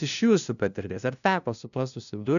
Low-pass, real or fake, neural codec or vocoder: 7.2 kHz; fake; codec, 16 kHz, 0.5 kbps, FunCodec, trained on LibriTTS, 25 frames a second